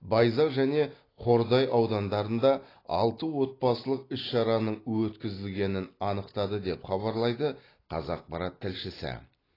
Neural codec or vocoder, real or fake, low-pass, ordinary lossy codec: none; real; 5.4 kHz; AAC, 24 kbps